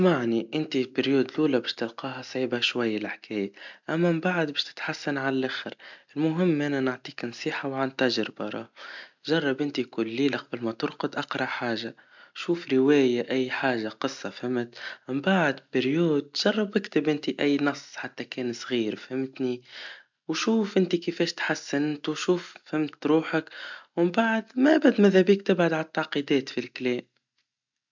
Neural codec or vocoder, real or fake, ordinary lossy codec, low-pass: none; real; MP3, 64 kbps; 7.2 kHz